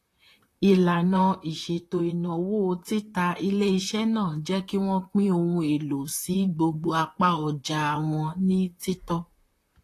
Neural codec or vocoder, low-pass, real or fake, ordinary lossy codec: vocoder, 44.1 kHz, 128 mel bands, Pupu-Vocoder; 14.4 kHz; fake; AAC, 48 kbps